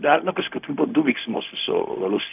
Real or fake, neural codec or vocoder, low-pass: fake; codec, 16 kHz, 0.4 kbps, LongCat-Audio-Codec; 3.6 kHz